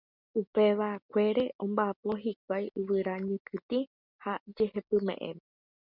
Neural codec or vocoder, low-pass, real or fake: none; 5.4 kHz; real